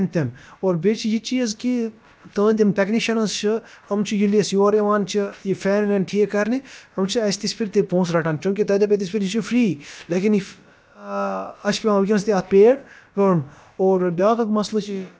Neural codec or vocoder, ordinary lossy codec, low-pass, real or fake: codec, 16 kHz, about 1 kbps, DyCAST, with the encoder's durations; none; none; fake